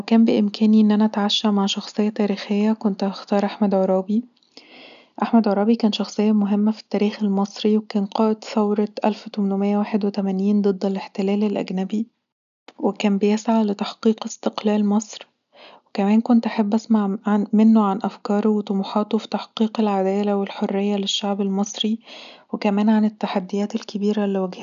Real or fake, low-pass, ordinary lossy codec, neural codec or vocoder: real; 7.2 kHz; none; none